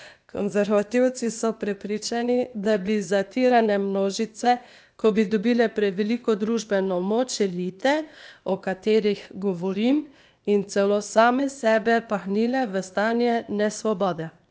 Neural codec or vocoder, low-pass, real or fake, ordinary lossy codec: codec, 16 kHz, 0.8 kbps, ZipCodec; none; fake; none